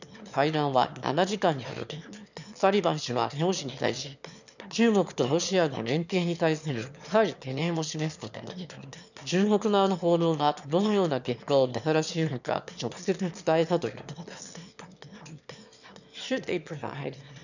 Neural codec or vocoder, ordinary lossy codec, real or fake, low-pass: autoencoder, 22.05 kHz, a latent of 192 numbers a frame, VITS, trained on one speaker; none; fake; 7.2 kHz